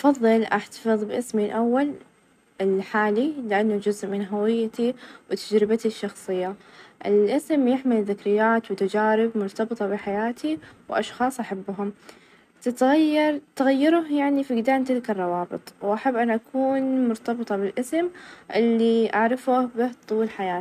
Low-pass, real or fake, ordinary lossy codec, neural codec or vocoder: 14.4 kHz; real; none; none